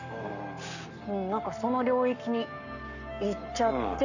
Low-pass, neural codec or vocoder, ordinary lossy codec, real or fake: 7.2 kHz; codec, 44.1 kHz, 7.8 kbps, Pupu-Codec; none; fake